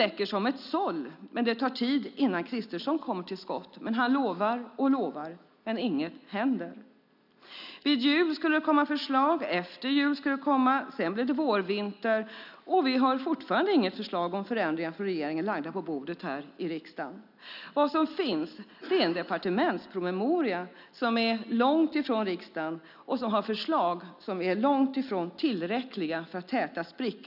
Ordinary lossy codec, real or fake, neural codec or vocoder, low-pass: none; real; none; 5.4 kHz